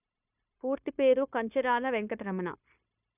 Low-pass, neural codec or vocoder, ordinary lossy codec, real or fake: 3.6 kHz; codec, 16 kHz, 0.9 kbps, LongCat-Audio-Codec; none; fake